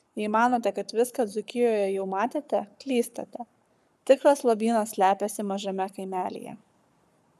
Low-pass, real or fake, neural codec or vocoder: 14.4 kHz; fake; codec, 44.1 kHz, 7.8 kbps, Pupu-Codec